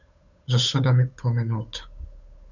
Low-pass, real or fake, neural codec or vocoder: 7.2 kHz; fake; codec, 16 kHz, 8 kbps, FunCodec, trained on Chinese and English, 25 frames a second